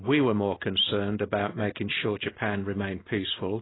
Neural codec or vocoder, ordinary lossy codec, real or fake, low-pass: none; AAC, 16 kbps; real; 7.2 kHz